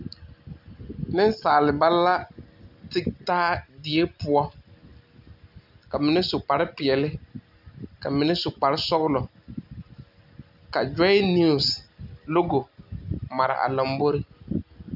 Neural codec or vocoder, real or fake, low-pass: none; real; 5.4 kHz